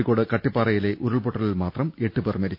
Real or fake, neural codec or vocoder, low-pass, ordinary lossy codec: real; none; 5.4 kHz; none